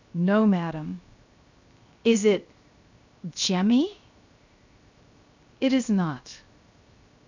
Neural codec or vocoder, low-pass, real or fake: codec, 16 kHz, 0.7 kbps, FocalCodec; 7.2 kHz; fake